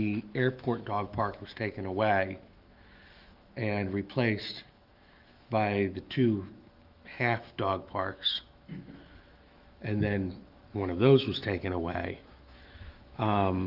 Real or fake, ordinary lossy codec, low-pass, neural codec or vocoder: fake; Opus, 32 kbps; 5.4 kHz; autoencoder, 48 kHz, 128 numbers a frame, DAC-VAE, trained on Japanese speech